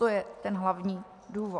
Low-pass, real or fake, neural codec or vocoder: 10.8 kHz; real; none